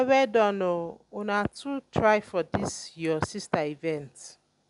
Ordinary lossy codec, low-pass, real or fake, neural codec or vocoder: none; 10.8 kHz; real; none